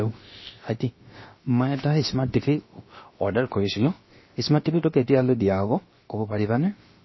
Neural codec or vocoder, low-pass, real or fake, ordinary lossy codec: codec, 16 kHz, about 1 kbps, DyCAST, with the encoder's durations; 7.2 kHz; fake; MP3, 24 kbps